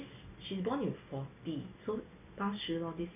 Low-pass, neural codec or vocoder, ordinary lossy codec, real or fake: 3.6 kHz; none; none; real